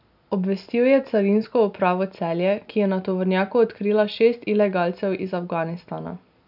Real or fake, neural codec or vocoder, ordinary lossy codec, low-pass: real; none; none; 5.4 kHz